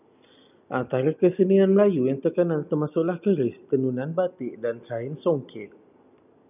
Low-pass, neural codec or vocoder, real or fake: 3.6 kHz; none; real